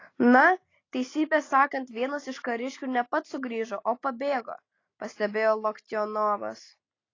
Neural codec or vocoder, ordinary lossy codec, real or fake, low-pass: none; AAC, 32 kbps; real; 7.2 kHz